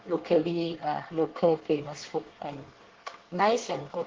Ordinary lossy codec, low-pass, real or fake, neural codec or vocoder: Opus, 16 kbps; 7.2 kHz; fake; codec, 24 kHz, 1 kbps, SNAC